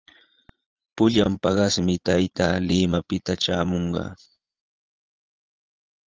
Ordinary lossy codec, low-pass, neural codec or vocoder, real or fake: Opus, 24 kbps; 7.2 kHz; vocoder, 44.1 kHz, 80 mel bands, Vocos; fake